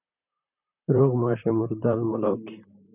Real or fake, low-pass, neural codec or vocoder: fake; 3.6 kHz; vocoder, 44.1 kHz, 128 mel bands, Pupu-Vocoder